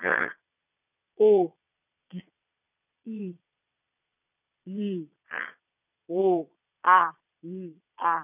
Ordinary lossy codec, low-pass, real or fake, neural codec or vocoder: none; 3.6 kHz; fake; vocoder, 22.05 kHz, 80 mel bands, Vocos